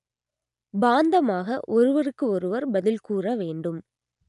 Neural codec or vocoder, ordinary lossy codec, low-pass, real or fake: none; none; 10.8 kHz; real